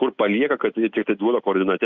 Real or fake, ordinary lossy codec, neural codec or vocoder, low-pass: real; Opus, 64 kbps; none; 7.2 kHz